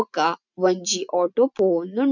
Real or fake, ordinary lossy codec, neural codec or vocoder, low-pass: real; none; none; 7.2 kHz